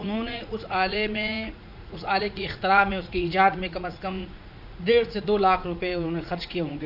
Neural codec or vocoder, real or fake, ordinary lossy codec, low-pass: vocoder, 44.1 kHz, 80 mel bands, Vocos; fake; none; 5.4 kHz